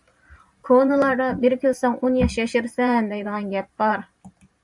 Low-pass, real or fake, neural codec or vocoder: 10.8 kHz; fake; vocoder, 44.1 kHz, 128 mel bands every 512 samples, BigVGAN v2